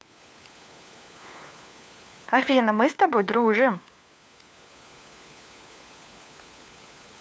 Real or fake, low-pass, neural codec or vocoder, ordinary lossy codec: fake; none; codec, 16 kHz, 4 kbps, FunCodec, trained on LibriTTS, 50 frames a second; none